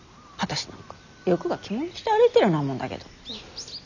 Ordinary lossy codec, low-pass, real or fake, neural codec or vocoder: none; 7.2 kHz; real; none